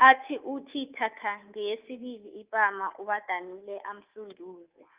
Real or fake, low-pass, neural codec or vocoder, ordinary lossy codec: fake; 3.6 kHz; codec, 24 kHz, 3.1 kbps, DualCodec; Opus, 24 kbps